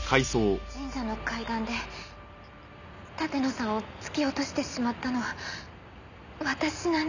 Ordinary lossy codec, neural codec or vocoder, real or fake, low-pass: none; none; real; 7.2 kHz